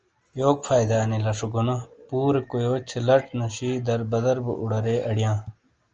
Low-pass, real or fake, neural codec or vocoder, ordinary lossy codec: 7.2 kHz; real; none; Opus, 24 kbps